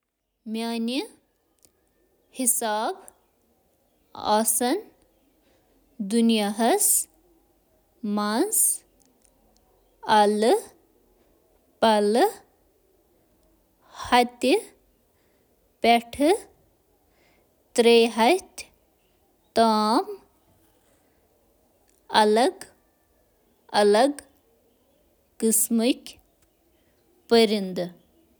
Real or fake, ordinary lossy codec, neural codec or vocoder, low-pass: real; none; none; none